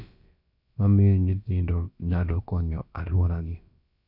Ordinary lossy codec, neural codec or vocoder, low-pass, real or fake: none; codec, 16 kHz, about 1 kbps, DyCAST, with the encoder's durations; 5.4 kHz; fake